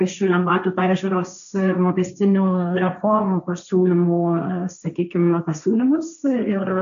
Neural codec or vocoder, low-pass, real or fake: codec, 16 kHz, 1.1 kbps, Voila-Tokenizer; 7.2 kHz; fake